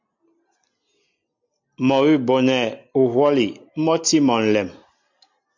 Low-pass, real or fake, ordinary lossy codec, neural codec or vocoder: 7.2 kHz; real; MP3, 64 kbps; none